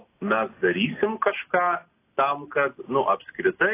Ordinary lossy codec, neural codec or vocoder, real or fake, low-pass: AAC, 24 kbps; none; real; 3.6 kHz